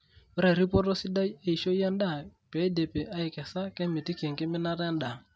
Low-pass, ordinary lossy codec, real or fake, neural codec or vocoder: none; none; real; none